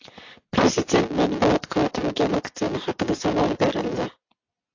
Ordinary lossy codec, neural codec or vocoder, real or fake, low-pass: AAC, 48 kbps; none; real; 7.2 kHz